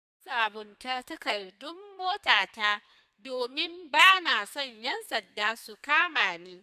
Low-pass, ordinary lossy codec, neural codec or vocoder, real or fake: 14.4 kHz; none; codec, 32 kHz, 1.9 kbps, SNAC; fake